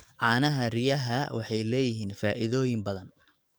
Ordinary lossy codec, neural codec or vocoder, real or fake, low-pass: none; codec, 44.1 kHz, 7.8 kbps, DAC; fake; none